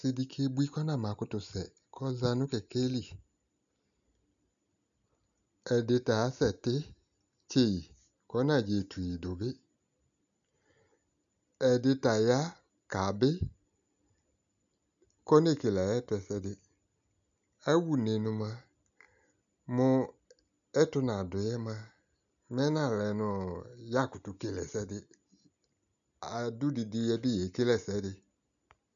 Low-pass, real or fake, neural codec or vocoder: 7.2 kHz; real; none